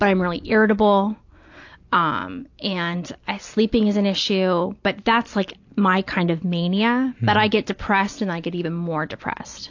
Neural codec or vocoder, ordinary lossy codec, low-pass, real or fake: none; AAC, 48 kbps; 7.2 kHz; real